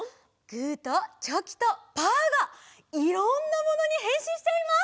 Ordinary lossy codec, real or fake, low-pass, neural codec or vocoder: none; real; none; none